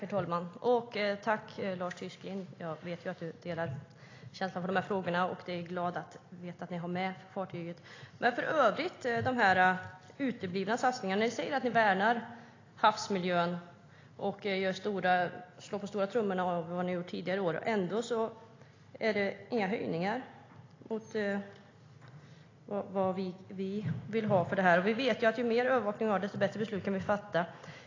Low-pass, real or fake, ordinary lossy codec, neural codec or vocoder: 7.2 kHz; real; AAC, 32 kbps; none